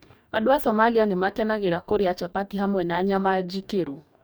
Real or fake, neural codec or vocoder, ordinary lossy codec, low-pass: fake; codec, 44.1 kHz, 2.6 kbps, DAC; none; none